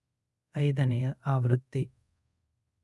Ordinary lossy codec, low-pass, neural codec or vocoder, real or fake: none; none; codec, 24 kHz, 0.5 kbps, DualCodec; fake